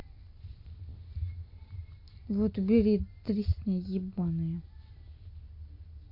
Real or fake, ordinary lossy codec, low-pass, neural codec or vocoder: real; AAC, 32 kbps; 5.4 kHz; none